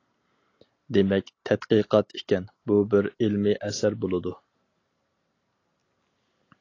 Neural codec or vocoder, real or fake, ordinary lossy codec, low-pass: none; real; AAC, 32 kbps; 7.2 kHz